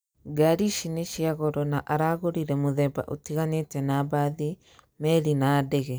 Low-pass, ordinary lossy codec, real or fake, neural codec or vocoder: none; none; real; none